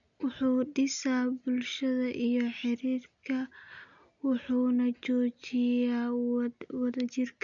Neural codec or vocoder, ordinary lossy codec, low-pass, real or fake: none; none; 7.2 kHz; real